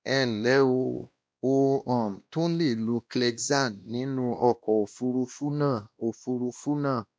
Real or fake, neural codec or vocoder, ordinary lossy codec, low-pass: fake; codec, 16 kHz, 1 kbps, X-Codec, WavLM features, trained on Multilingual LibriSpeech; none; none